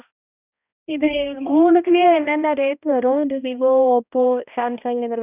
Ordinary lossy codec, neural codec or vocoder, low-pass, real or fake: none; codec, 16 kHz, 1 kbps, X-Codec, HuBERT features, trained on balanced general audio; 3.6 kHz; fake